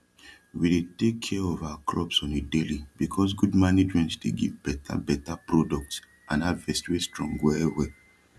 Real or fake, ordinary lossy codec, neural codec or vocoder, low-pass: real; none; none; none